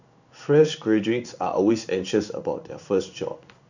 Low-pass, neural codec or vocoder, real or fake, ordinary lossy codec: 7.2 kHz; codec, 16 kHz in and 24 kHz out, 1 kbps, XY-Tokenizer; fake; none